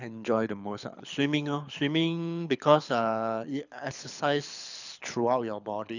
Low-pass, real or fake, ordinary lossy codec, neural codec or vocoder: 7.2 kHz; fake; none; codec, 24 kHz, 6 kbps, HILCodec